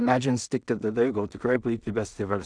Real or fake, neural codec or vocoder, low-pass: fake; codec, 16 kHz in and 24 kHz out, 0.4 kbps, LongCat-Audio-Codec, two codebook decoder; 9.9 kHz